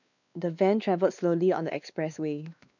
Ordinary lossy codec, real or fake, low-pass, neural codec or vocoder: none; fake; 7.2 kHz; codec, 16 kHz, 2 kbps, X-Codec, WavLM features, trained on Multilingual LibriSpeech